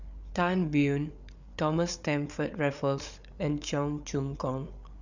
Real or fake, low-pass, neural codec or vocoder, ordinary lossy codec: fake; 7.2 kHz; codec, 16 kHz, 8 kbps, FreqCodec, larger model; none